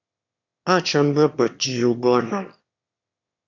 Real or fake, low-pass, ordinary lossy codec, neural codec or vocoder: fake; 7.2 kHz; AAC, 48 kbps; autoencoder, 22.05 kHz, a latent of 192 numbers a frame, VITS, trained on one speaker